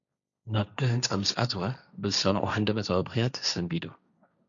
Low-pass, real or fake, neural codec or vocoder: 7.2 kHz; fake; codec, 16 kHz, 1.1 kbps, Voila-Tokenizer